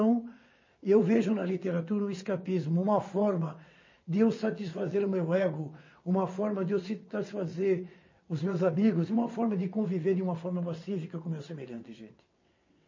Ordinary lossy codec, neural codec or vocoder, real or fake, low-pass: MP3, 32 kbps; vocoder, 22.05 kHz, 80 mel bands, WaveNeXt; fake; 7.2 kHz